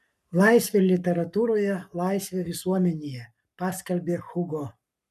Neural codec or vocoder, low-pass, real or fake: codec, 44.1 kHz, 7.8 kbps, Pupu-Codec; 14.4 kHz; fake